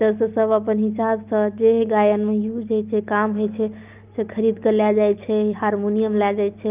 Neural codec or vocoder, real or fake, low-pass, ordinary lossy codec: none; real; 3.6 kHz; Opus, 24 kbps